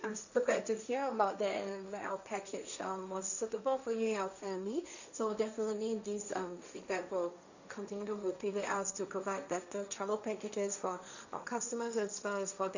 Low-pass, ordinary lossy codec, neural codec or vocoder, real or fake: 7.2 kHz; none; codec, 16 kHz, 1.1 kbps, Voila-Tokenizer; fake